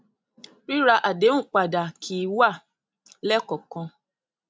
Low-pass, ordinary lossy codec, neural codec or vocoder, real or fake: none; none; none; real